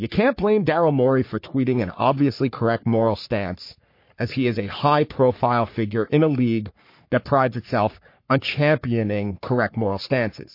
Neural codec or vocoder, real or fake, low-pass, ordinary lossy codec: codec, 44.1 kHz, 3.4 kbps, Pupu-Codec; fake; 5.4 kHz; MP3, 32 kbps